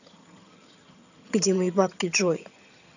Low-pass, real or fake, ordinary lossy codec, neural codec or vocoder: 7.2 kHz; fake; none; vocoder, 22.05 kHz, 80 mel bands, HiFi-GAN